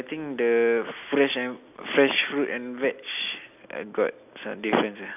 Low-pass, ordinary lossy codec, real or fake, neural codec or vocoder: 3.6 kHz; none; real; none